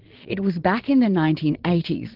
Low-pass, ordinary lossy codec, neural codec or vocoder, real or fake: 5.4 kHz; Opus, 16 kbps; vocoder, 22.05 kHz, 80 mel bands, Vocos; fake